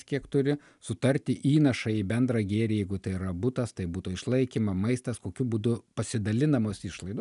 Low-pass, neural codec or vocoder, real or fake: 10.8 kHz; none; real